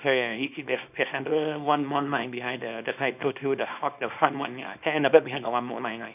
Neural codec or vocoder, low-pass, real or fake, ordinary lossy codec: codec, 24 kHz, 0.9 kbps, WavTokenizer, small release; 3.6 kHz; fake; none